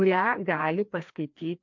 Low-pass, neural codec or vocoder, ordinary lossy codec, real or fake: 7.2 kHz; codec, 16 kHz, 2 kbps, FreqCodec, larger model; MP3, 48 kbps; fake